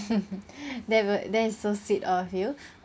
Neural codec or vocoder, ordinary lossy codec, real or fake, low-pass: none; none; real; none